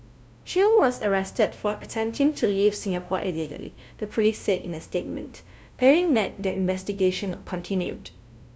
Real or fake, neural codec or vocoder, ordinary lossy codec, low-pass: fake; codec, 16 kHz, 0.5 kbps, FunCodec, trained on LibriTTS, 25 frames a second; none; none